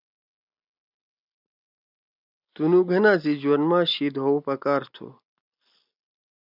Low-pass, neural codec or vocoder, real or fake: 5.4 kHz; none; real